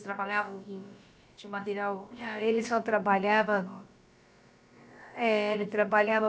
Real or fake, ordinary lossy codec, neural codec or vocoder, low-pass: fake; none; codec, 16 kHz, about 1 kbps, DyCAST, with the encoder's durations; none